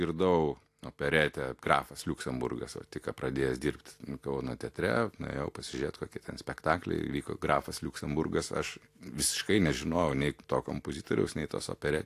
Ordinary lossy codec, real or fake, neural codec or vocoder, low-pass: AAC, 64 kbps; real; none; 14.4 kHz